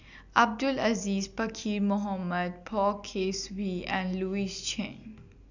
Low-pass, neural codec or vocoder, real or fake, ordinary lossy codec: 7.2 kHz; none; real; none